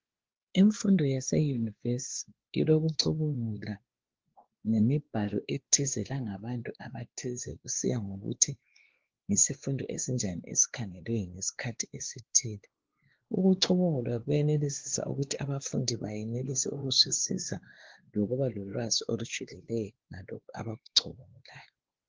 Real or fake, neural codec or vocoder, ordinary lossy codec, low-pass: fake; codec, 16 kHz, 2 kbps, X-Codec, WavLM features, trained on Multilingual LibriSpeech; Opus, 16 kbps; 7.2 kHz